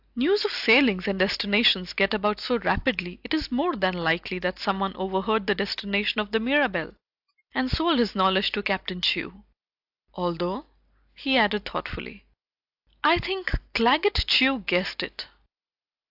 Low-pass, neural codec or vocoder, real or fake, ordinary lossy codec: 5.4 kHz; none; real; AAC, 48 kbps